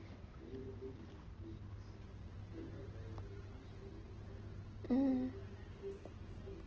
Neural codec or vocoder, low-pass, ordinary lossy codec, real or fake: none; 7.2 kHz; Opus, 16 kbps; real